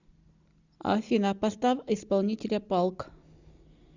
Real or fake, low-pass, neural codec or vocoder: real; 7.2 kHz; none